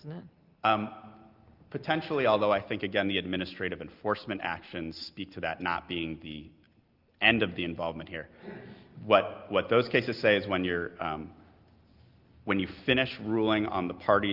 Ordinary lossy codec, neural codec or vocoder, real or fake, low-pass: Opus, 32 kbps; none; real; 5.4 kHz